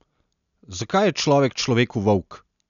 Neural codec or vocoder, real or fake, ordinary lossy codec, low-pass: none; real; none; 7.2 kHz